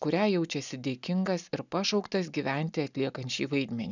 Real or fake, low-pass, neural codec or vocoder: real; 7.2 kHz; none